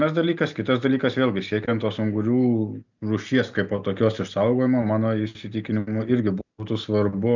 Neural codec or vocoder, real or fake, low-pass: none; real; 7.2 kHz